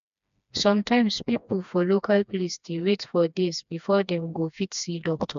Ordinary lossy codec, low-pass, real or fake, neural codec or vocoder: MP3, 64 kbps; 7.2 kHz; fake; codec, 16 kHz, 2 kbps, FreqCodec, smaller model